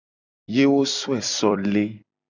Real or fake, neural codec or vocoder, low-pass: fake; vocoder, 44.1 kHz, 128 mel bands every 256 samples, BigVGAN v2; 7.2 kHz